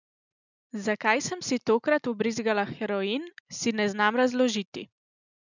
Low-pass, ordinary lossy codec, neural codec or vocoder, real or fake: 7.2 kHz; none; none; real